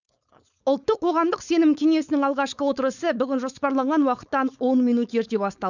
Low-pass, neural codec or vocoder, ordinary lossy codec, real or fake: 7.2 kHz; codec, 16 kHz, 4.8 kbps, FACodec; none; fake